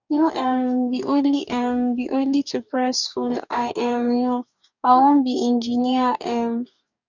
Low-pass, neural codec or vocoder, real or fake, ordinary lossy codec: 7.2 kHz; codec, 44.1 kHz, 2.6 kbps, DAC; fake; none